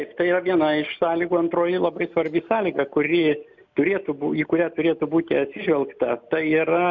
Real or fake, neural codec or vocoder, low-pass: real; none; 7.2 kHz